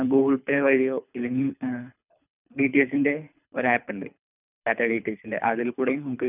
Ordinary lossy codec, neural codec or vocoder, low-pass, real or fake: none; codec, 24 kHz, 3 kbps, HILCodec; 3.6 kHz; fake